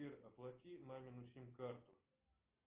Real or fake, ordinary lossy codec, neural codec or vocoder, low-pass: real; Opus, 24 kbps; none; 3.6 kHz